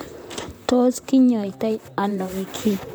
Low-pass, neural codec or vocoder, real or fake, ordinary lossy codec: none; vocoder, 44.1 kHz, 128 mel bands, Pupu-Vocoder; fake; none